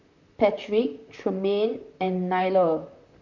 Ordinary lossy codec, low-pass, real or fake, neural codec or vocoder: none; 7.2 kHz; fake; vocoder, 44.1 kHz, 128 mel bands, Pupu-Vocoder